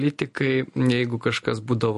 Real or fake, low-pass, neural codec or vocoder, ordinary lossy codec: real; 14.4 kHz; none; MP3, 48 kbps